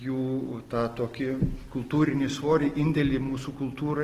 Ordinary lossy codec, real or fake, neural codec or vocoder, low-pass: Opus, 16 kbps; real; none; 14.4 kHz